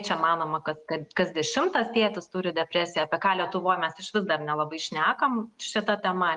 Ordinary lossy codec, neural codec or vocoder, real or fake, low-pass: Opus, 32 kbps; none; real; 10.8 kHz